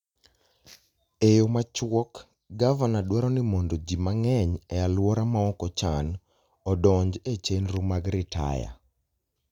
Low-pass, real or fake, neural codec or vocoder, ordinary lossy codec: 19.8 kHz; real; none; none